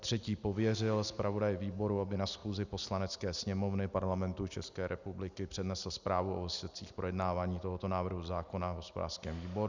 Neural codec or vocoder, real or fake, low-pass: none; real; 7.2 kHz